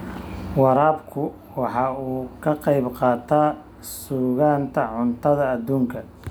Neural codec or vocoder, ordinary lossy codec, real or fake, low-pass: none; none; real; none